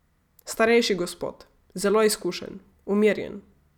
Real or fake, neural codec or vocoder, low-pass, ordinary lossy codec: real; none; 19.8 kHz; none